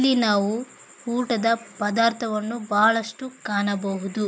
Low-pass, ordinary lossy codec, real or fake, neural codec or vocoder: none; none; real; none